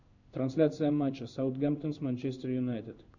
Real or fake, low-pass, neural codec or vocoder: fake; 7.2 kHz; codec, 16 kHz in and 24 kHz out, 1 kbps, XY-Tokenizer